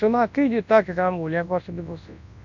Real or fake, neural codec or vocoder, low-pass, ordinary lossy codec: fake; codec, 24 kHz, 0.9 kbps, WavTokenizer, large speech release; 7.2 kHz; none